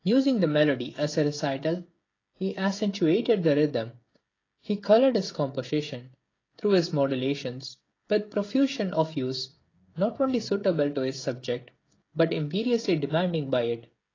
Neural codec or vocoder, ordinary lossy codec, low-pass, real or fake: codec, 16 kHz, 16 kbps, FreqCodec, smaller model; AAC, 32 kbps; 7.2 kHz; fake